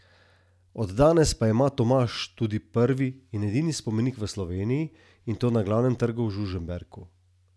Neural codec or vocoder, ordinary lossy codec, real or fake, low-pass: none; none; real; none